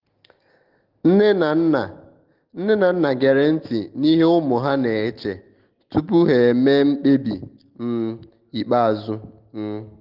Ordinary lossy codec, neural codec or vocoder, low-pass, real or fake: Opus, 16 kbps; none; 5.4 kHz; real